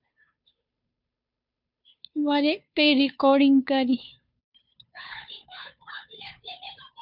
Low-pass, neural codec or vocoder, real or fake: 5.4 kHz; codec, 16 kHz, 2 kbps, FunCodec, trained on Chinese and English, 25 frames a second; fake